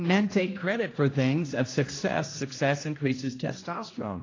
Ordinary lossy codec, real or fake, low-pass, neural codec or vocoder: AAC, 32 kbps; fake; 7.2 kHz; codec, 16 kHz, 1 kbps, X-Codec, HuBERT features, trained on general audio